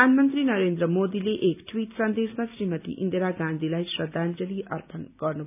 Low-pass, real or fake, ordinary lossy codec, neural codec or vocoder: 3.6 kHz; real; none; none